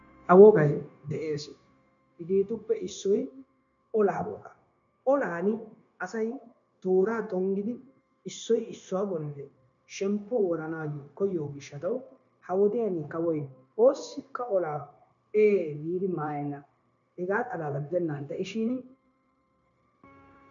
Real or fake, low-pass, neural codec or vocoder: fake; 7.2 kHz; codec, 16 kHz, 0.9 kbps, LongCat-Audio-Codec